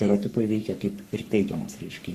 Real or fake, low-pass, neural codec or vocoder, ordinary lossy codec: fake; 14.4 kHz; codec, 44.1 kHz, 3.4 kbps, Pupu-Codec; Opus, 64 kbps